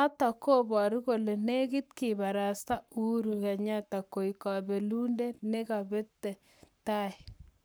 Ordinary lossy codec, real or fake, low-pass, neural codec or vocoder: none; fake; none; codec, 44.1 kHz, 7.8 kbps, Pupu-Codec